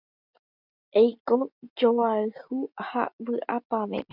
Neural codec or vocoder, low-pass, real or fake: none; 5.4 kHz; real